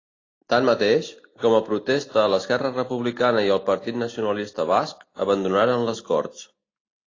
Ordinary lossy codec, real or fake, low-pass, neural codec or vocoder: AAC, 32 kbps; real; 7.2 kHz; none